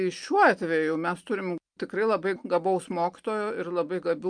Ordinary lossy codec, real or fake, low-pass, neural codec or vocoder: Opus, 32 kbps; real; 9.9 kHz; none